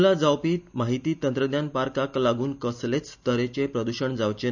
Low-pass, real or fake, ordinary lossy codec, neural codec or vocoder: 7.2 kHz; real; none; none